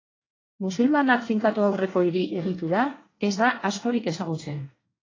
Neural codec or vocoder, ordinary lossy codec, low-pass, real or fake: codec, 24 kHz, 1 kbps, SNAC; AAC, 32 kbps; 7.2 kHz; fake